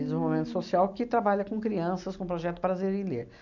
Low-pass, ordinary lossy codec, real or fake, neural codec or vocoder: 7.2 kHz; none; real; none